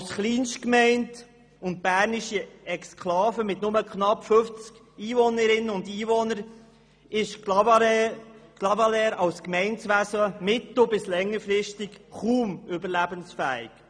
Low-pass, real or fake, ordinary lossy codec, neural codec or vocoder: 9.9 kHz; real; none; none